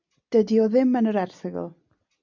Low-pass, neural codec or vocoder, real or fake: 7.2 kHz; none; real